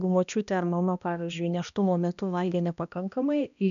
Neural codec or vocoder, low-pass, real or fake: codec, 16 kHz, 1 kbps, X-Codec, HuBERT features, trained on balanced general audio; 7.2 kHz; fake